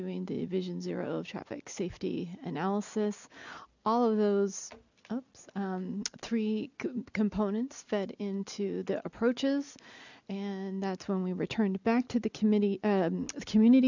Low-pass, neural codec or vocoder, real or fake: 7.2 kHz; none; real